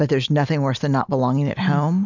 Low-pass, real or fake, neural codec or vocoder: 7.2 kHz; real; none